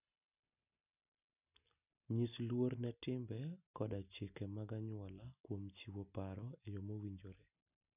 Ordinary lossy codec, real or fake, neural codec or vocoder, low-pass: none; real; none; 3.6 kHz